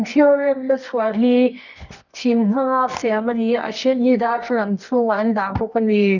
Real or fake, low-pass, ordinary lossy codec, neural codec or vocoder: fake; 7.2 kHz; none; codec, 24 kHz, 0.9 kbps, WavTokenizer, medium music audio release